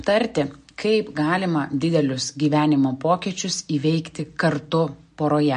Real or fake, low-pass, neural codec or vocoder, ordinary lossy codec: real; 14.4 kHz; none; MP3, 48 kbps